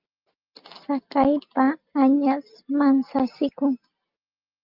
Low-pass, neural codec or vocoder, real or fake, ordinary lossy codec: 5.4 kHz; none; real; Opus, 24 kbps